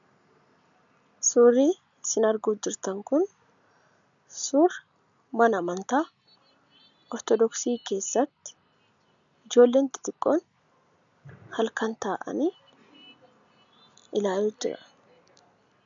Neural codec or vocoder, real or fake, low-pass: none; real; 7.2 kHz